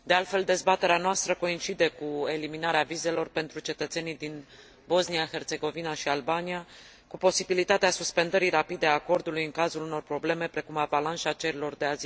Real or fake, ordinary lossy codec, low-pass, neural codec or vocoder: real; none; none; none